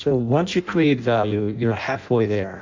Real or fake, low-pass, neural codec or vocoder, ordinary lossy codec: fake; 7.2 kHz; codec, 16 kHz in and 24 kHz out, 0.6 kbps, FireRedTTS-2 codec; MP3, 64 kbps